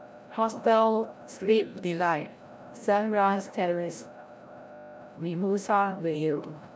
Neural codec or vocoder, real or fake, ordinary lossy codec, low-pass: codec, 16 kHz, 0.5 kbps, FreqCodec, larger model; fake; none; none